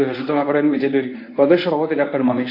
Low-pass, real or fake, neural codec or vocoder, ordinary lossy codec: 5.4 kHz; fake; codec, 24 kHz, 0.9 kbps, WavTokenizer, medium speech release version 1; AAC, 32 kbps